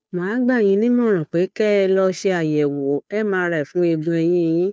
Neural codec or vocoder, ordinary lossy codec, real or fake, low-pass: codec, 16 kHz, 2 kbps, FunCodec, trained on Chinese and English, 25 frames a second; none; fake; none